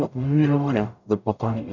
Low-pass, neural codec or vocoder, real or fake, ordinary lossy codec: 7.2 kHz; codec, 44.1 kHz, 0.9 kbps, DAC; fake; none